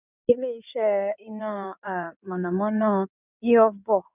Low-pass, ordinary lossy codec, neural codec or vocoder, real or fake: 3.6 kHz; none; codec, 16 kHz in and 24 kHz out, 2.2 kbps, FireRedTTS-2 codec; fake